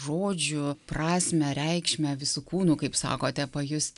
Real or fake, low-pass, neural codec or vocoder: real; 10.8 kHz; none